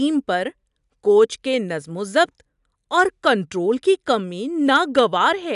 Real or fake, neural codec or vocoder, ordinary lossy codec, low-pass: real; none; none; 10.8 kHz